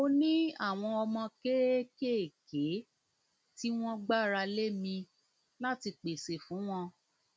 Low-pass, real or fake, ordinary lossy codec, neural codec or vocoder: none; real; none; none